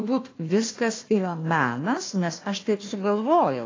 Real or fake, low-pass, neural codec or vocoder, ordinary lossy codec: fake; 7.2 kHz; codec, 16 kHz, 1 kbps, FunCodec, trained on Chinese and English, 50 frames a second; AAC, 32 kbps